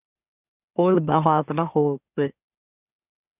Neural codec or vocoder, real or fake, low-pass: autoencoder, 44.1 kHz, a latent of 192 numbers a frame, MeloTTS; fake; 3.6 kHz